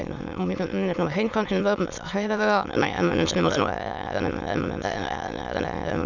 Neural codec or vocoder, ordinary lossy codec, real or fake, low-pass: autoencoder, 22.05 kHz, a latent of 192 numbers a frame, VITS, trained on many speakers; none; fake; 7.2 kHz